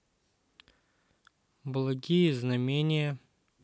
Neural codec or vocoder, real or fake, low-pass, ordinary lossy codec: none; real; none; none